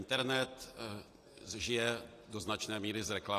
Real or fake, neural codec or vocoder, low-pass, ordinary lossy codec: real; none; 14.4 kHz; AAC, 48 kbps